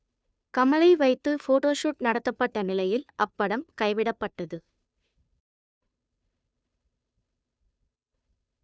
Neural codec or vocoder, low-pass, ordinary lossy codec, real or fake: codec, 16 kHz, 2 kbps, FunCodec, trained on Chinese and English, 25 frames a second; none; none; fake